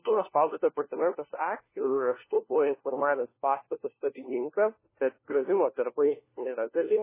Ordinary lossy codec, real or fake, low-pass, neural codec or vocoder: MP3, 16 kbps; fake; 3.6 kHz; codec, 16 kHz, 1 kbps, FunCodec, trained on LibriTTS, 50 frames a second